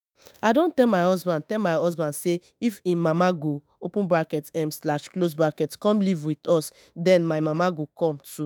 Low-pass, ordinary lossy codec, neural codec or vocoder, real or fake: none; none; autoencoder, 48 kHz, 32 numbers a frame, DAC-VAE, trained on Japanese speech; fake